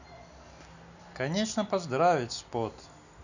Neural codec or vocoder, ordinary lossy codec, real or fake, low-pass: none; none; real; 7.2 kHz